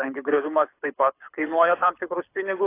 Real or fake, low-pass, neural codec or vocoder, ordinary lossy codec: fake; 3.6 kHz; codec, 24 kHz, 6 kbps, HILCodec; AAC, 24 kbps